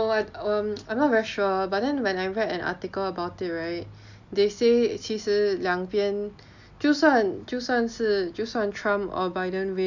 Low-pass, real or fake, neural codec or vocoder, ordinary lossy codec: 7.2 kHz; real; none; none